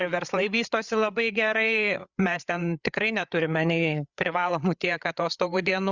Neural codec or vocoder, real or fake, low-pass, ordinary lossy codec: codec, 16 kHz, 8 kbps, FreqCodec, larger model; fake; 7.2 kHz; Opus, 64 kbps